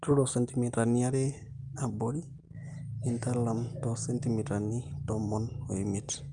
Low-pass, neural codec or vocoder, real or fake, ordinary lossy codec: 9.9 kHz; none; real; Opus, 32 kbps